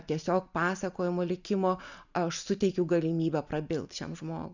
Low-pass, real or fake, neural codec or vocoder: 7.2 kHz; real; none